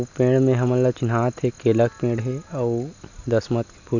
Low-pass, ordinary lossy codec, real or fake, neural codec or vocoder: 7.2 kHz; none; real; none